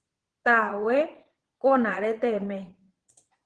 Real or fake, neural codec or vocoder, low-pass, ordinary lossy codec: fake; vocoder, 22.05 kHz, 80 mel bands, Vocos; 9.9 kHz; Opus, 16 kbps